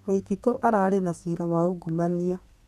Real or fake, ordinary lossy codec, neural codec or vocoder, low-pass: fake; none; codec, 32 kHz, 1.9 kbps, SNAC; 14.4 kHz